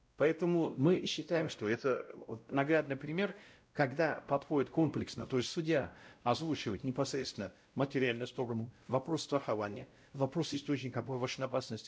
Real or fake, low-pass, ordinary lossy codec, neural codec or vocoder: fake; none; none; codec, 16 kHz, 0.5 kbps, X-Codec, WavLM features, trained on Multilingual LibriSpeech